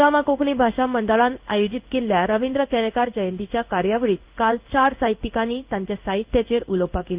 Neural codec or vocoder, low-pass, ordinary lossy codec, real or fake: codec, 16 kHz in and 24 kHz out, 1 kbps, XY-Tokenizer; 3.6 kHz; Opus, 24 kbps; fake